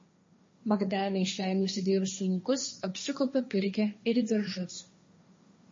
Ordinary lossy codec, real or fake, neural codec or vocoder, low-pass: MP3, 32 kbps; fake; codec, 16 kHz, 1.1 kbps, Voila-Tokenizer; 7.2 kHz